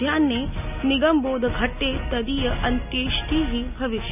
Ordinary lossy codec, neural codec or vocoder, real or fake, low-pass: none; none; real; 3.6 kHz